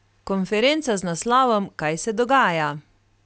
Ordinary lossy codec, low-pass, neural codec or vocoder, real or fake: none; none; none; real